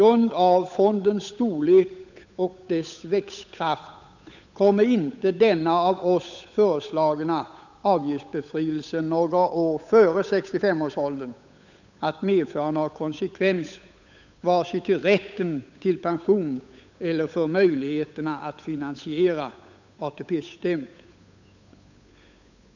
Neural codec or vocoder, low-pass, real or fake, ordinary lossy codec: codec, 16 kHz, 8 kbps, FunCodec, trained on Chinese and English, 25 frames a second; 7.2 kHz; fake; none